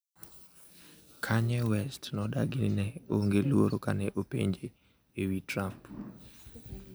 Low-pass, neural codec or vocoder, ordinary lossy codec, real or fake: none; none; none; real